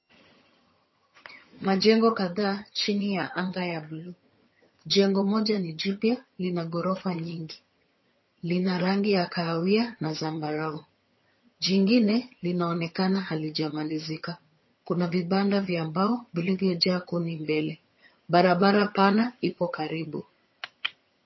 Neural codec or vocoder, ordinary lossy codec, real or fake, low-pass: vocoder, 22.05 kHz, 80 mel bands, HiFi-GAN; MP3, 24 kbps; fake; 7.2 kHz